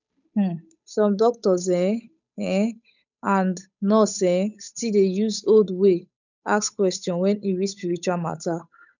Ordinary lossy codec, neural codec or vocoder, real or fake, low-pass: none; codec, 16 kHz, 8 kbps, FunCodec, trained on Chinese and English, 25 frames a second; fake; 7.2 kHz